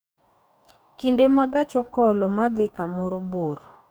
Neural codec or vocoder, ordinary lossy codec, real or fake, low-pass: codec, 44.1 kHz, 2.6 kbps, DAC; none; fake; none